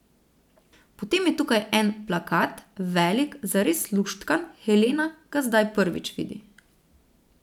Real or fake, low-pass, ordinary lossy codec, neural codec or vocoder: fake; 19.8 kHz; none; vocoder, 44.1 kHz, 128 mel bands every 512 samples, BigVGAN v2